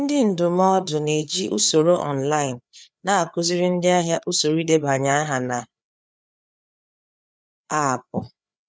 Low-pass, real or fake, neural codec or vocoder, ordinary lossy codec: none; fake; codec, 16 kHz, 4 kbps, FreqCodec, larger model; none